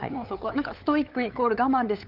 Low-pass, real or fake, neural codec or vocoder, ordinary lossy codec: 5.4 kHz; fake; codec, 16 kHz, 8 kbps, FunCodec, trained on LibriTTS, 25 frames a second; Opus, 32 kbps